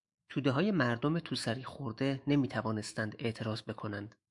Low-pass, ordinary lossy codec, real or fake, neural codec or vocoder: 10.8 kHz; MP3, 96 kbps; fake; autoencoder, 48 kHz, 128 numbers a frame, DAC-VAE, trained on Japanese speech